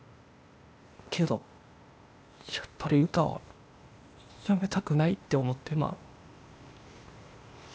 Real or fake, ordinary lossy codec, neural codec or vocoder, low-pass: fake; none; codec, 16 kHz, 0.8 kbps, ZipCodec; none